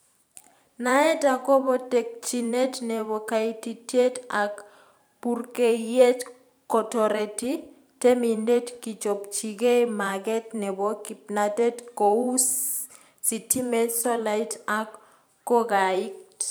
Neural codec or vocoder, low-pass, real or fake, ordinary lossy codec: vocoder, 44.1 kHz, 128 mel bands every 512 samples, BigVGAN v2; none; fake; none